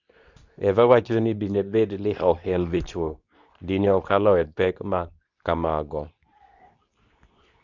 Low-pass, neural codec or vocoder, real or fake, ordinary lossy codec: 7.2 kHz; codec, 24 kHz, 0.9 kbps, WavTokenizer, medium speech release version 2; fake; none